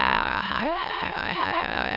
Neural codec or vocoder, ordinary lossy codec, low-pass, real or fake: autoencoder, 22.05 kHz, a latent of 192 numbers a frame, VITS, trained on many speakers; none; 5.4 kHz; fake